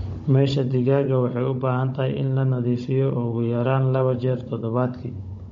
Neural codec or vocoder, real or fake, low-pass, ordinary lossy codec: codec, 16 kHz, 16 kbps, FunCodec, trained on Chinese and English, 50 frames a second; fake; 7.2 kHz; MP3, 48 kbps